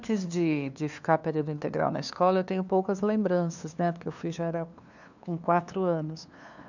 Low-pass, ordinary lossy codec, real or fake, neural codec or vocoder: 7.2 kHz; none; fake; codec, 16 kHz, 2 kbps, FunCodec, trained on LibriTTS, 25 frames a second